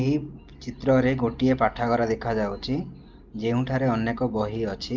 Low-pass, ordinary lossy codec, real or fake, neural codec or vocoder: 7.2 kHz; Opus, 32 kbps; real; none